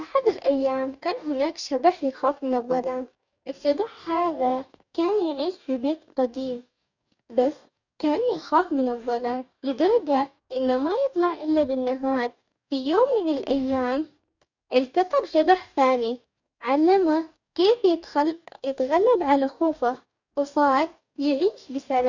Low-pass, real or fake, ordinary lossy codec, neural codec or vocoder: 7.2 kHz; fake; none; codec, 44.1 kHz, 2.6 kbps, DAC